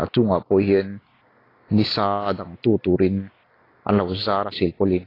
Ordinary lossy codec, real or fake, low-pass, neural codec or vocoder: AAC, 24 kbps; real; 5.4 kHz; none